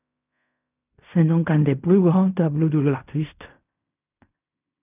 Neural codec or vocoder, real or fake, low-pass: codec, 16 kHz in and 24 kHz out, 0.4 kbps, LongCat-Audio-Codec, fine tuned four codebook decoder; fake; 3.6 kHz